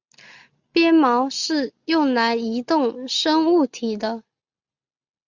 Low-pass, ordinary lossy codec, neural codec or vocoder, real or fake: 7.2 kHz; Opus, 64 kbps; none; real